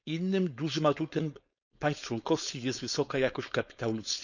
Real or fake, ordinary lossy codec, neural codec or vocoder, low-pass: fake; none; codec, 16 kHz, 4.8 kbps, FACodec; 7.2 kHz